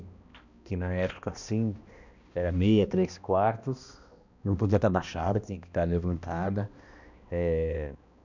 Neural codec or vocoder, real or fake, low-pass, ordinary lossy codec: codec, 16 kHz, 1 kbps, X-Codec, HuBERT features, trained on balanced general audio; fake; 7.2 kHz; none